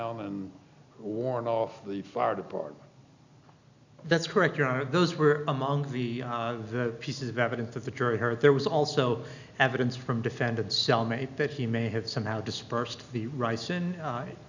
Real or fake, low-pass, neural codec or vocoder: real; 7.2 kHz; none